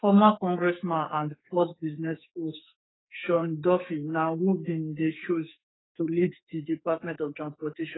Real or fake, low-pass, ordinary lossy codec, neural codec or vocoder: fake; 7.2 kHz; AAC, 16 kbps; codec, 44.1 kHz, 2.6 kbps, SNAC